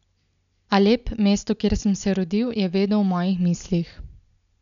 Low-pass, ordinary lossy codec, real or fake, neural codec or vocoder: 7.2 kHz; none; real; none